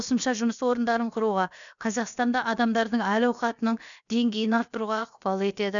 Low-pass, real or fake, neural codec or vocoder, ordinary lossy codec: 7.2 kHz; fake; codec, 16 kHz, about 1 kbps, DyCAST, with the encoder's durations; none